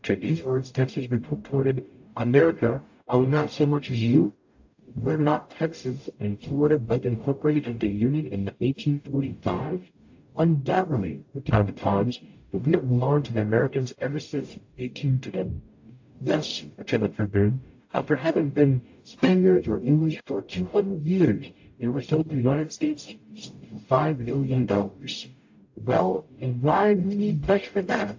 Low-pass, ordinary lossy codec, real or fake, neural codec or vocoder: 7.2 kHz; AAC, 48 kbps; fake; codec, 44.1 kHz, 0.9 kbps, DAC